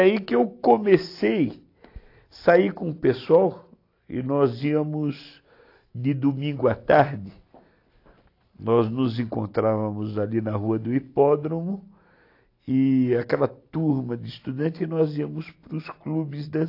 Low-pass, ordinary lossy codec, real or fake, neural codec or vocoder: 5.4 kHz; AAC, 32 kbps; real; none